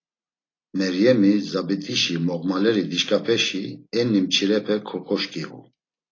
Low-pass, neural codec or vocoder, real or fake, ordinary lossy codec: 7.2 kHz; none; real; AAC, 32 kbps